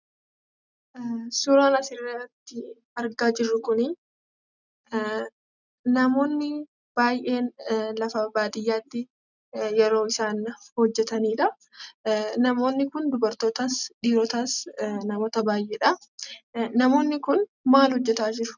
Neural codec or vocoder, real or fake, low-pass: none; real; 7.2 kHz